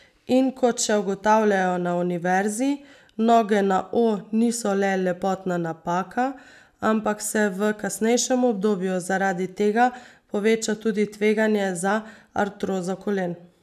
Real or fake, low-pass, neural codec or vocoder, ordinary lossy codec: real; 14.4 kHz; none; none